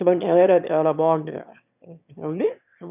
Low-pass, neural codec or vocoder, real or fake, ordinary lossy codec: 3.6 kHz; autoencoder, 22.05 kHz, a latent of 192 numbers a frame, VITS, trained on one speaker; fake; none